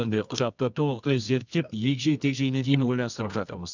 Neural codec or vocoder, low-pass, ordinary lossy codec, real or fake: codec, 24 kHz, 0.9 kbps, WavTokenizer, medium music audio release; 7.2 kHz; none; fake